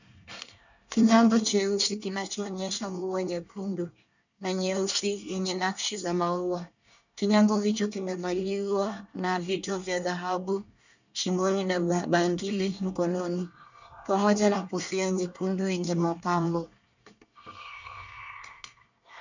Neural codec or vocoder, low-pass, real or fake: codec, 24 kHz, 1 kbps, SNAC; 7.2 kHz; fake